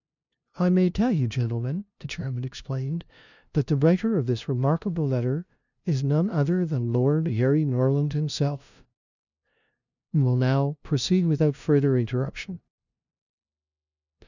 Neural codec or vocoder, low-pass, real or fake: codec, 16 kHz, 0.5 kbps, FunCodec, trained on LibriTTS, 25 frames a second; 7.2 kHz; fake